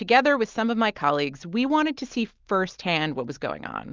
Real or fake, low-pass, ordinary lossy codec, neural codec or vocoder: real; 7.2 kHz; Opus, 32 kbps; none